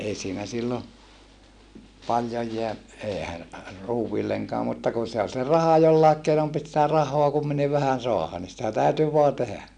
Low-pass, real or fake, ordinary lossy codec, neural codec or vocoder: 9.9 kHz; real; none; none